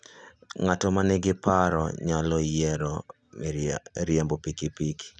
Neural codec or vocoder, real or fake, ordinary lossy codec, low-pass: none; real; none; none